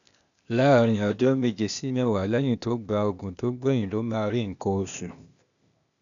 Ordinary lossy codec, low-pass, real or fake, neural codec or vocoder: none; 7.2 kHz; fake; codec, 16 kHz, 0.8 kbps, ZipCodec